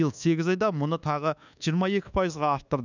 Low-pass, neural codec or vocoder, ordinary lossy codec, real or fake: 7.2 kHz; codec, 24 kHz, 1.2 kbps, DualCodec; none; fake